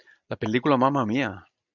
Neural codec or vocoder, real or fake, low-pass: none; real; 7.2 kHz